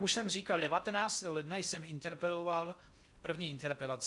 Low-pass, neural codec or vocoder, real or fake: 10.8 kHz; codec, 16 kHz in and 24 kHz out, 0.6 kbps, FocalCodec, streaming, 4096 codes; fake